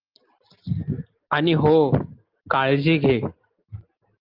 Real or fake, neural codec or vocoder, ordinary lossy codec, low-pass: real; none; Opus, 32 kbps; 5.4 kHz